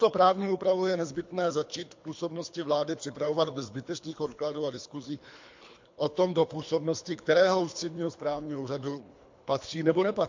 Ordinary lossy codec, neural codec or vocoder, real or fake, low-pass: MP3, 48 kbps; codec, 24 kHz, 3 kbps, HILCodec; fake; 7.2 kHz